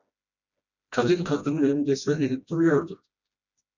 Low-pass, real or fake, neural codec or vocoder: 7.2 kHz; fake; codec, 16 kHz, 1 kbps, FreqCodec, smaller model